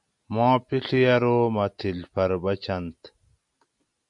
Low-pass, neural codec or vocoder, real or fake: 10.8 kHz; none; real